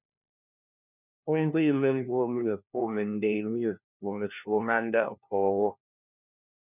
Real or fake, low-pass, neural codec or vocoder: fake; 3.6 kHz; codec, 16 kHz, 1 kbps, FunCodec, trained on LibriTTS, 50 frames a second